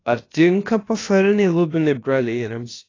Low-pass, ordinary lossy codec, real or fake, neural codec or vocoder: 7.2 kHz; AAC, 32 kbps; fake; codec, 16 kHz, about 1 kbps, DyCAST, with the encoder's durations